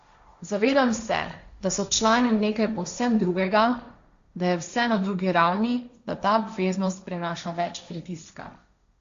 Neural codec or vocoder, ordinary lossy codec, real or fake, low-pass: codec, 16 kHz, 1.1 kbps, Voila-Tokenizer; Opus, 64 kbps; fake; 7.2 kHz